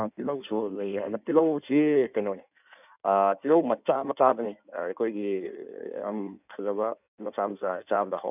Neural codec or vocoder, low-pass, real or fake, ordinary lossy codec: codec, 16 kHz in and 24 kHz out, 1.1 kbps, FireRedTTS-2 codec; 3.6 kHz; fake; none